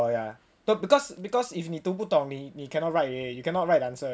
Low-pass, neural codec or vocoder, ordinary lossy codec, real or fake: none; none; none; real